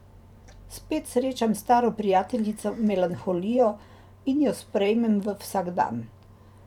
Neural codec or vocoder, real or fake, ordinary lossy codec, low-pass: vocoder, 44.1 kHz, 128 mel bands every 512 samples, BigVGAN v2; fake; none; 19.8 kHz